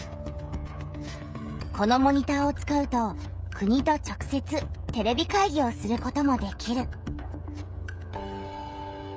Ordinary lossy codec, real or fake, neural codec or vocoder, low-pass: none; fake; codec, 16 kHz, 16 kbps, FreqCodec, smaller model; none